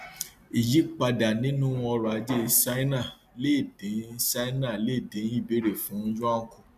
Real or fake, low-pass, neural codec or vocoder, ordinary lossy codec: real; 14.4 kHz; none; MP3, 96 kbps